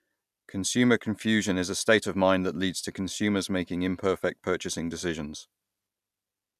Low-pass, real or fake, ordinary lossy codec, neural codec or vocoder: 14.4 kHz; real; none; none